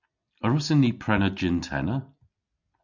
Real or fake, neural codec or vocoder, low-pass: real; none; 7.2 kHz